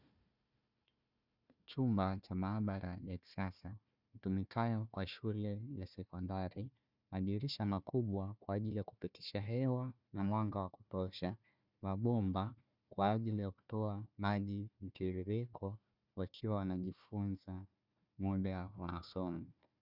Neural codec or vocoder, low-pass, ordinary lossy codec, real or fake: codec, 16 kHz, 1 kbps, FunCodec, trained on Chinese and English, 50 frames a second; 5.4 kHz; Opus, 64 kbps; fake